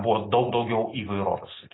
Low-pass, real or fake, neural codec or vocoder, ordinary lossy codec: 7.2 kHz; real; none; AAC, 16 kbps